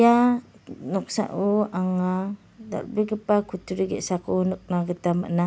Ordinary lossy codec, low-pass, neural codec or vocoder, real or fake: none; none; none; real